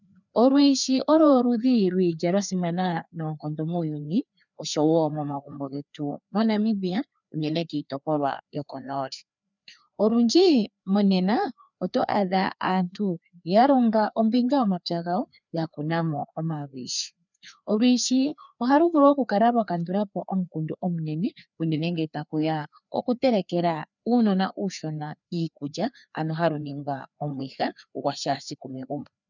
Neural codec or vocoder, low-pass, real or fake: codec, 16 kHz, 2 kbps, FreqCodec, larger model; 7.2 kHz; fake